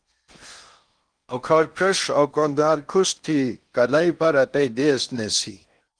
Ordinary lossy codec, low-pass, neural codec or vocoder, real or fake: Opus, 32 kbps; 9.9 kHz; codec, 16 kHz in and 24 kHz out, 0.6 kbps, FocalCodec, streaming, 4096 codes; fake